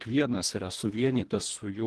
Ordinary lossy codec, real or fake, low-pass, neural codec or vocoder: Opus, 16 kbps; fake; 10.8 kHz; codec, 24 kHz, 1.5 kbps, HILCodec